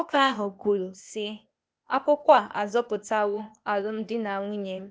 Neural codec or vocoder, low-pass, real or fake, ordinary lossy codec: codec, 16 kHz, 0.8 kbps, ZipCodec; none; fake; none